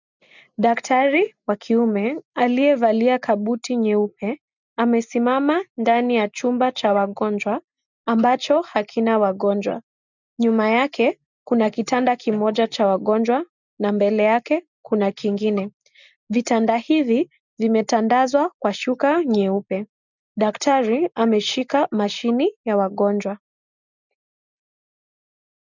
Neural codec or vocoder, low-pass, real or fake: none; 7.2 kHz; real